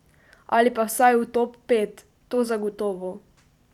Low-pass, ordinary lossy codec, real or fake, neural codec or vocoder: 19.8 kHz; none; fake; vocoder, 44.1 kHz, 128 mel bands every 512 samples, BigVGAN v2